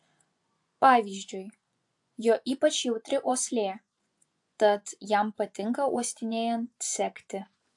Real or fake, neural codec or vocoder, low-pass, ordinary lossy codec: fake; vocoder, 24 kHz, 100 mel bands, Vocos; 10.8 kHz; AAC, 64 kbps